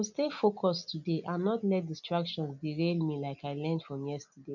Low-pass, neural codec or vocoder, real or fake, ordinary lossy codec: 7.2 kHz; none; real; none